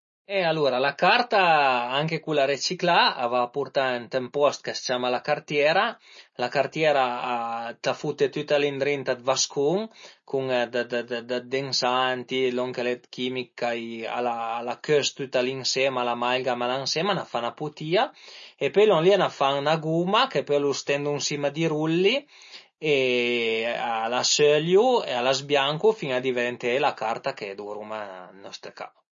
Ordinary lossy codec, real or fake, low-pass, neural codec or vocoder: MP3, 32 kbps; real; 7.2 kHz; none